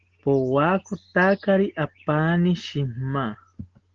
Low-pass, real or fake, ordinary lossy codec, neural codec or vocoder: 7.2 kHz; real; Opus, 16 kbps; none